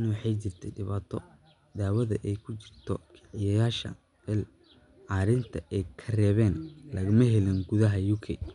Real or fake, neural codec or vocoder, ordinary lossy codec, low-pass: real; none; none; 10.8 kHz